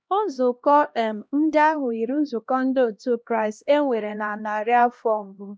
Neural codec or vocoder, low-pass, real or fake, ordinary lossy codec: codec, 16 kHz, 1 kbps, X-Codec, HuBERT features, trained on LibriSpeech; none; fake; none